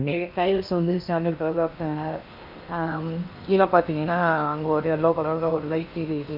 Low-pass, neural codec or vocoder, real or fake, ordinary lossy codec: 5.4 kHz; codec, 16 kHz in and 24 kHz out, 0.8 kbps, FocalCodec, streaming, 65536 codes; fake; none